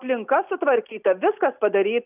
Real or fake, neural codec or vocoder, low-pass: real; none; 3.6 kHz